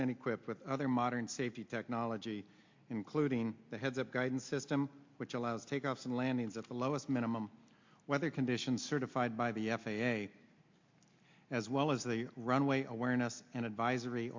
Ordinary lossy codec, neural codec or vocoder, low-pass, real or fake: MP3, 64 kbps; none; 7.2 kHz; real